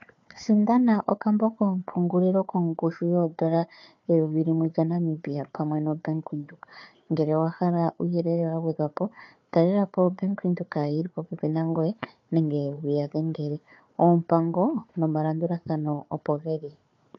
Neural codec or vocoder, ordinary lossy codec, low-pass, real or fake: codec, 16 kHz, 4 kbps, FunCodec, trained on Chinese and English, 50 frames a second; AAC, 48 kbps; 7.2 kHz; fake